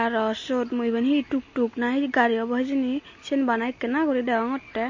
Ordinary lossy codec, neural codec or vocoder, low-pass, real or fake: MP3, 32 kbps; none; 7.2 kHz; real